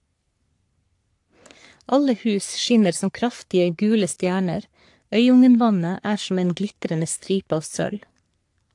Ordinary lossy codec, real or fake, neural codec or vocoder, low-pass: AAC, 64 kbps; fake; codec, 44.1 kHz, 3.4 kbps, Pupu-Codec; 10.8 kHz